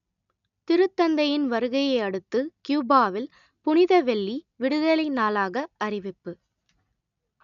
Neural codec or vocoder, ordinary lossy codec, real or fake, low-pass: none; none; real; 7.2 kHz